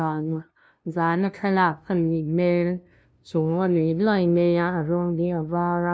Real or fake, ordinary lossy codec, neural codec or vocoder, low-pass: fake; none; codec, 16 kHz, 0.5 kbps, FunCodec, trained on LibriTTS, 25 frames a second; none